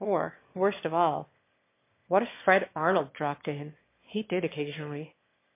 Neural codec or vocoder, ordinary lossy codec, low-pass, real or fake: autoencoder, 22.05 kHz, a latent of 192 numbers a frame, VITS, trained on one speaker; MP3, 24 kbps; 3.6 kHz; fake